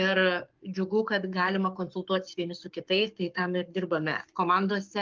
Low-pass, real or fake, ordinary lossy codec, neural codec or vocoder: 7.2 kHz; fake; Opus, 32 kbps; codec, 44.1 kHz, 7.8 kbps, Pupu-Codec